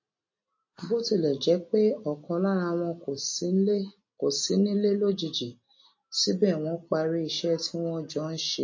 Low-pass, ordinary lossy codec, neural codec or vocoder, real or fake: 7.2 kHz; MP3, 32 kbps; none; real